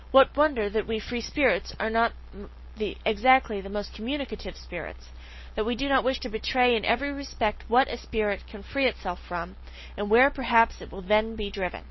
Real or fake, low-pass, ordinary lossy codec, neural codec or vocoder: real; 7.2 kHz; MP3, 24 kbps; none